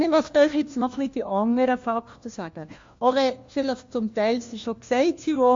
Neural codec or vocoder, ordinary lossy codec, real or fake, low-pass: codec, 16 kHz, 1 kbps, FunCodec, trained on LibriTTS, 50 frames a second; MP3, 48 kbps; fake; 7.2 kHz